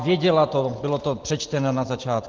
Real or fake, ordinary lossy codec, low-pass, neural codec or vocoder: real; Opus, 16 kbps; 7.2 kHz; none